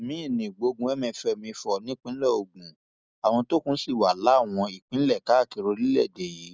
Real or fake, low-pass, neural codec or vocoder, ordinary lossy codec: real; none; none; none